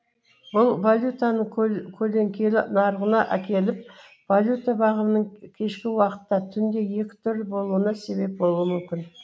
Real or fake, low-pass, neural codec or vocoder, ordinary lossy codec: real; none; none; none